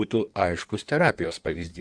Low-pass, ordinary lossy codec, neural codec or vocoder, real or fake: 9.9 kHz; Opus, 64 kbps; codec, 16 kHz in and 24 kHz out, 1.1 kbps, FireRedTTS-2 codec; fake